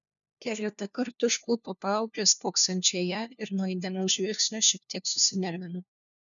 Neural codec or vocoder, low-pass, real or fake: codec, 16 kHz, 1 kbps, FunCodec, trained on LibriTTS, 50 frames a second; 7.2 kHz; fake